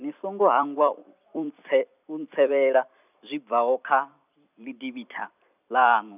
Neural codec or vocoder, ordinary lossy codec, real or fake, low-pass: vocoder, 44.1 kHz, 128 mel bands every 256 samples, BigVGAN v2; none; fake; 3.6 kHz